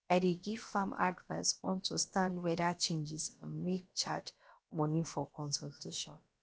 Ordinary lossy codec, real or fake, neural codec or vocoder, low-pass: none; fake; codec, 16 kHz, about 1 kbps, DyCAST, with the encoder's durations; none